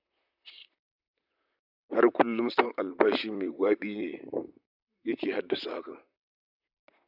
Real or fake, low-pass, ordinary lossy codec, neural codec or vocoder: fake; 5.4 kHz; none; vocoder, 44.1 kHz, 128 mel bands, Pupu-Vocoder